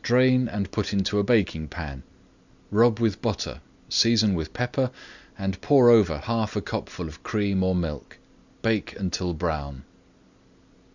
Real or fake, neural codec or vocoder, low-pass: real; none; 7.2 kHz